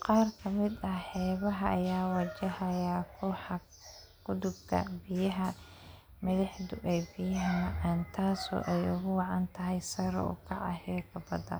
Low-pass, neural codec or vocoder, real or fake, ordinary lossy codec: none; none; real; none